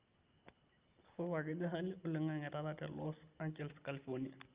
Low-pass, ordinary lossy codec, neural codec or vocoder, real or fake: 3.6 kHz; Opus, 32 kbps; none; real